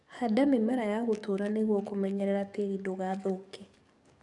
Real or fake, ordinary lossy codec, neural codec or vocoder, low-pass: fake; none; codec, 44.1 kHz, 7.8 kbps, DAC; 10.8 kHz